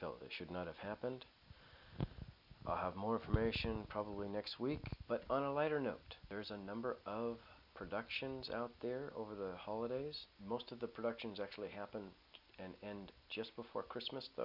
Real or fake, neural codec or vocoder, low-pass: real; none; 5.4 kHz